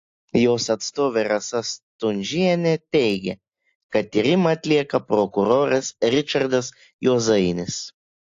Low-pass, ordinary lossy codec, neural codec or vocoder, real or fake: 7.2 kHz; AAC, 48 kbps; none; real